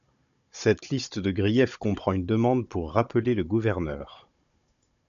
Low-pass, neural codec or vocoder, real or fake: 7.2 kHz; codec, 16 kHz, 16 kbps, FunCodec, trained on Chinese and English, 50 frames a second; fake